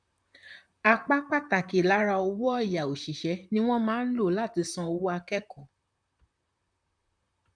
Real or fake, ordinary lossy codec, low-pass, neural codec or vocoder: fake; none; 9.9 kHz; vocoder, 44.1 kHz, 128 mel bands, Pupu-Vocoder